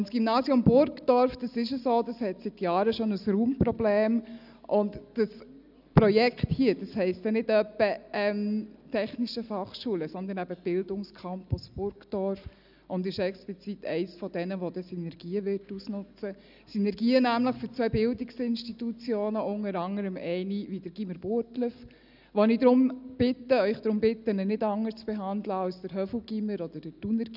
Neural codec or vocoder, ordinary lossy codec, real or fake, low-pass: none; none; real; 5.4 kHz